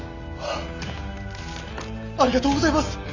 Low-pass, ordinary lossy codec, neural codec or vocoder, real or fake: 7.2 kHz; none; none; real